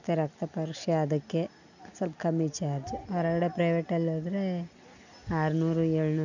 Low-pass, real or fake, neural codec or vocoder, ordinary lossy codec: 7.2 kHz; real; none; none